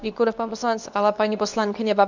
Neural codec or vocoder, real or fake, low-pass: codec, 24 kHz, 0.9 kbps, WavTokenizer, medium speech release version 2; fake; 7.2 kHz